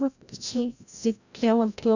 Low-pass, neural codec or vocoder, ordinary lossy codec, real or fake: 7.2 kHz; codec, 16 kHz, 0.5 kbps, FreqCodec, larger model; none; fake